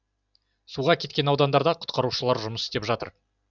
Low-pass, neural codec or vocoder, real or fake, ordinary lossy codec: 7.2 kHz; vocoder, 44.1 kHz, 128 mel bands every 512 samples, BigVGAN v2; fake; none